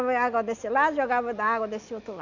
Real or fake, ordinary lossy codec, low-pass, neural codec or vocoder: real; none; 7.2 kHz; none